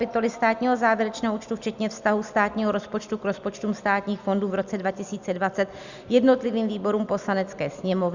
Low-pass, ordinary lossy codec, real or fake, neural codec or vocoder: 7.2 kHz; Opus, 64 kbps; real; none